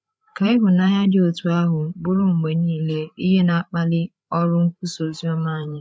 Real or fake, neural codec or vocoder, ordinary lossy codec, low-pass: fake; codec, 16 kHz, 8 kbps, FreqCodec, larger model; none; none